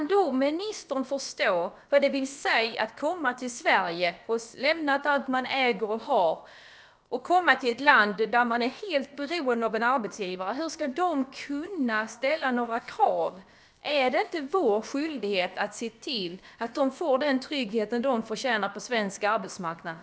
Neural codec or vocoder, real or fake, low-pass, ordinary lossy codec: codec, 16 kHz, about 1 kbps, DyCAST, with the encoder's durations; fake; none; none